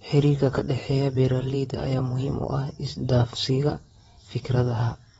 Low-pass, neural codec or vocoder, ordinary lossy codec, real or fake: 9.9 kHz; vocoder, 22.05 kHz, 80 mel bands, WaveNeXt; AAC, 24 kbps; fake